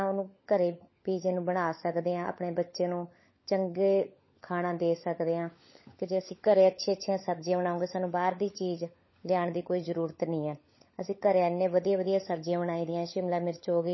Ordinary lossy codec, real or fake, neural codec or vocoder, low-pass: MP3, 24 kbps; fake; codec, 16 kHz, 16 kbps, FunCodec, trained on LibriTTS, 50 frames a second; 7.2 kHz